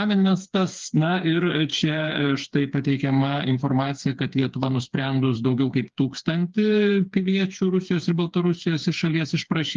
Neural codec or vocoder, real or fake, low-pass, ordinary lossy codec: codec, 16 kHz, 4 kbps, FreqCodec, smaller model; fake; 7.2 kHz; Opus, 32 kbps